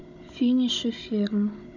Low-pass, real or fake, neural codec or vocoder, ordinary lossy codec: 7.2 kHz; fake; codec, 16 kHz, 16 kbps, FunCodec, trained on Chinese and English, 50 frames a second; none